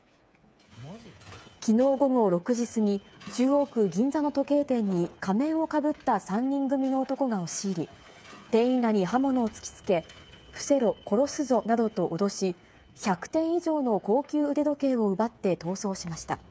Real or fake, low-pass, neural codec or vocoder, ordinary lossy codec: fake; none; codec, 16 kHz, 8 kbps, FreqCodec, smaller model; none